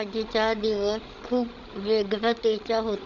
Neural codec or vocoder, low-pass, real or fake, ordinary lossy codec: codec, 16 kHz, 16 kbps, FreqCodec, larger model; 7.2 kHz; fake; MP3, 64 kbps